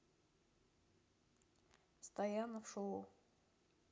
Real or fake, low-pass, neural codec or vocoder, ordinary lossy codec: real; none; none; none